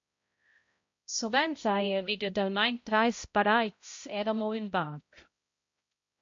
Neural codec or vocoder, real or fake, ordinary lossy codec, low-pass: codec, 16 kHz, 0.5 kbps, X-Codec, HuBERT features, trained on balanced general audio; fake; MP3, 48 kbps; 7.2 kHz